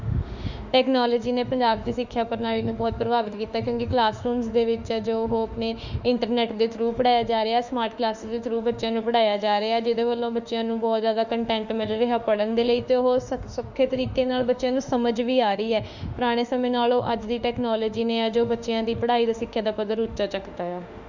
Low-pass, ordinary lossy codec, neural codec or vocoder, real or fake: 7.2 kHz; none; autoencoder, 48 kHz, 32 numbers a frame, DAC-VAE, trained on Japanese speech; fake